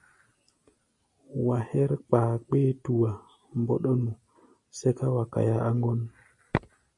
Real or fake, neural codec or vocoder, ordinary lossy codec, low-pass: real; none; MP3, 48 kbps; 10.8 kHz